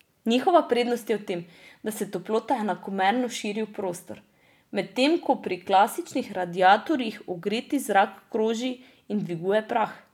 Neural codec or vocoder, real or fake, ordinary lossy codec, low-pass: none; real; none; 19.8 kHz